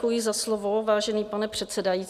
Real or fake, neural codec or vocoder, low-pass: real; none; 14.4 kHz